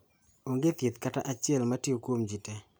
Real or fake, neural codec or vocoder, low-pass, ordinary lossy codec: real; none; none; none